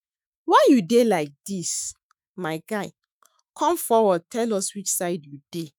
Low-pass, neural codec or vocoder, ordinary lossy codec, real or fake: none; autoencoder, 48 kHz, 128 numbers a frame, DAC-VAE, trained on Japanese speech; none; fake